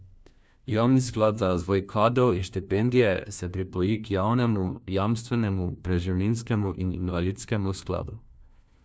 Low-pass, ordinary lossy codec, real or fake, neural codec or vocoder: none; none; fake; codec, 16 kHz, 1 kbps, FunCodec, trained on LibriTTS, 50 frames a second